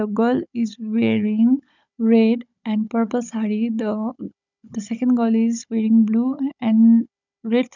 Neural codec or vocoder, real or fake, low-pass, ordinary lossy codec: codec, 16 kHz, 16 kbps, FunCodec, trained on Chinese and English, 50 frames a second; fake; 7.2 kHz; none